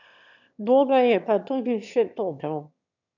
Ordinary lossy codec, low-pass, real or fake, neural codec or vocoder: none; 7.2 kHz; fake; autoencoder, 22.05 kHz, a latent of 192 numbers a frame, VITS, trained on one speaker